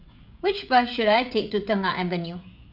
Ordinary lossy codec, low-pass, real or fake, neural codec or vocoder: none; 5.4 kHz; fake; codec, 16 kHz, 16 kbps, FreqCodec, smaller model